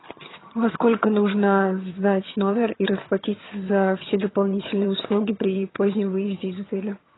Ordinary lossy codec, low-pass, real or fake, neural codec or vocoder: AAC, 16 kbps; 7.2 kHz; fake; vocoder, 22.05 kHz, 80 mel bands, HiFi-GAN